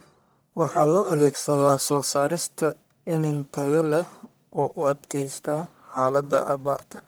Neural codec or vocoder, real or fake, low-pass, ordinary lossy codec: codec, 44.1 kHz, 1.7 kbps, Pupu-Codec; fake; none; none